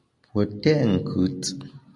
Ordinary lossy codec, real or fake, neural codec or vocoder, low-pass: AAC, 48 kbps; real; none; 10.8 kHz